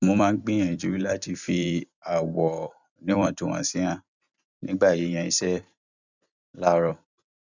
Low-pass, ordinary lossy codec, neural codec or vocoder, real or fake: 7.2 kHz; none; vocoder, 44.1 kHz, 128 mel bands every 256 samples, BigVGAN v2; fake